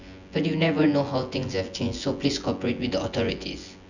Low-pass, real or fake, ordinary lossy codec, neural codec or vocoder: 7.2 kHz; fake; none; vocoder, 24 kHz, 100 mel bands, Vocos